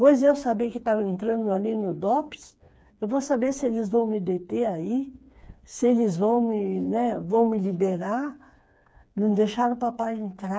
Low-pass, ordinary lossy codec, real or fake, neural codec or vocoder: none; none; fake; codec, 16 kHz, 4 kbps, FreqCodec, smaller model